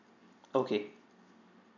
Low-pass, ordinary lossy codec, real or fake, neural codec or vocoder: 7.2 kHz; none; real; none